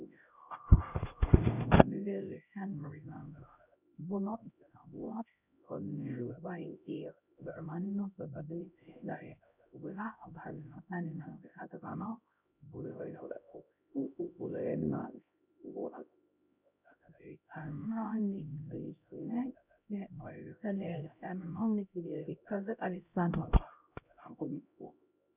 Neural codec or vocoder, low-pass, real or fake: codec, 16 kHz, 0.5 kbps, X-Codec, HuBERT features, trained on LibriSpeech; 3.6 kHz; fake